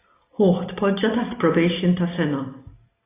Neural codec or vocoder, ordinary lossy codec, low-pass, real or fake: none; AAC, 16 kbps; 3.6 kHz; real